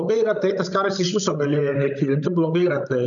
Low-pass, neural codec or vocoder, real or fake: 7.2 kHz; codec, 16 kHz, 16 kbps, FreqCodec, larger model; fake